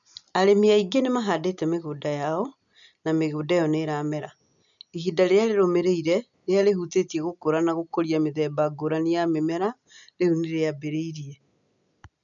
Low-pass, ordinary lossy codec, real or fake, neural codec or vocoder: 7.2 kHz; none; real; none